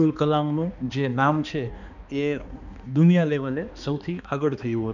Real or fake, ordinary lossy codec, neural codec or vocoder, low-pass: fake; none; codec, 16 kHz, 2 kbps, X-Codec, HuBERT features, trained on balanced general audio; 7.2 kHz